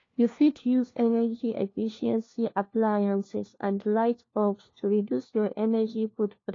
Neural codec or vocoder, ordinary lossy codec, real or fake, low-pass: codec, 16 kHz, 1 kbps, FunCodec, trained on LibriTTS, 50 frames a second; AAC, 32 kbps; fake; 7.2 kHz